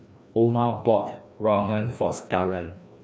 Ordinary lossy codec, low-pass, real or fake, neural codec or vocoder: none; none; fake; codec, 16 kHz, 1 kbps, FreqCodec, larger model